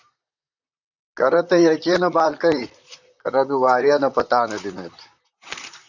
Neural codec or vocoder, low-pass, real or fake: vocoder, 44.1 kHz, 128 mel bands, Pupu-Vocoder; 7.2 kHz; fake